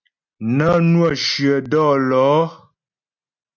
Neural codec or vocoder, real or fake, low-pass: none; real; 7.2 kHz